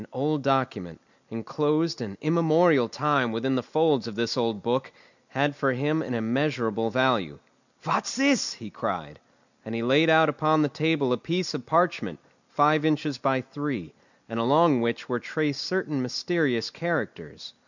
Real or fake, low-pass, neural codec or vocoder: real; 7.2 kHz; none